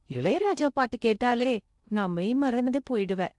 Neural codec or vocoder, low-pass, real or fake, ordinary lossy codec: codec, 16 kHz in and 24 kHz out, 0.6 kbps, FocalCodec, streaming, 2048 codes; 10.8 kHz; fake; MP3, 96 kbps